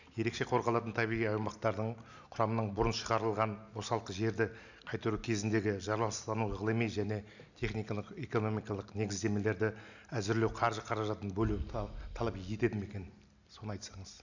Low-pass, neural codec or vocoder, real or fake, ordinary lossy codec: 7.2 kHz; none; real; none